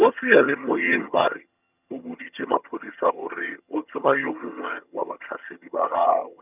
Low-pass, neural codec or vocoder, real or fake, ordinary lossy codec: 3.6 kHz; vocoder, 22.05 kHz, 80 mel bands, HiFi-GAN; fake; none